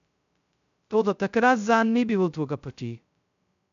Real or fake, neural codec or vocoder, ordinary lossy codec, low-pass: fake; codec, 16 kHz, 0.2 kbps, FocalCodec; none; 7.2 kHz